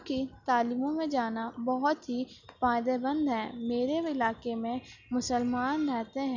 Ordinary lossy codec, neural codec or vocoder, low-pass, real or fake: none; none; 7.2 kHz; real